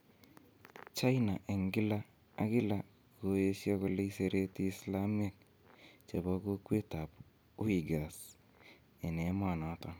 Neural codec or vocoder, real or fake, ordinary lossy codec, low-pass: none; real; none; none